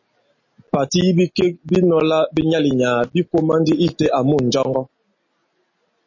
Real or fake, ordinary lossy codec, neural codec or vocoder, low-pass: real; MP3, 32 kbps; none; 7.2 kHz